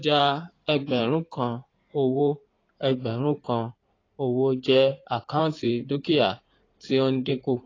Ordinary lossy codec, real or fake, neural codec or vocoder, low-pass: AAC, 32 kbps; fake; codec, 16 kHz in and 24 kHz out, 2.2 kbps, FireRedTTS-2 codec; 7.2 kHz